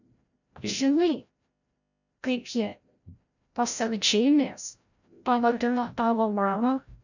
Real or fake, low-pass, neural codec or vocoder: fake; 7.2 kHz; codec, 16 kHz, 0.5 kbps, FreqCodec, larger model